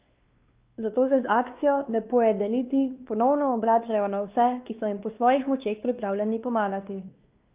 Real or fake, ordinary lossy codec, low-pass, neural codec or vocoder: fake; Opus, 32 kbps; 3.6 kHz; codec, 16 kHz, 2 kbps, X-Codec, WavLM features, trained on Multilingual LibriSpeech